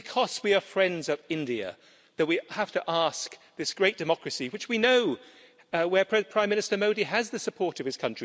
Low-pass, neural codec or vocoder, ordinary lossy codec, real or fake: none; none; none; real